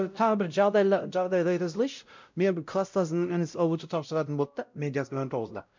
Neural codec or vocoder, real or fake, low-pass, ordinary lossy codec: codec, 16 kHz, 0.5 kbps, X-Codec, WavLM features, trained on Multilingual LibriSpeech; fake; 7.2 kHz; MP3, 64 kbps